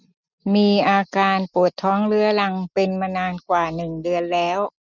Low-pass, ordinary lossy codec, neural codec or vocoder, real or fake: 7.2 kHz; none; none; real